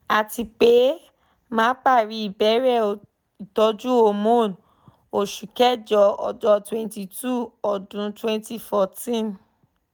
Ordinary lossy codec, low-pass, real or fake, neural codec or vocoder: none; none; real; none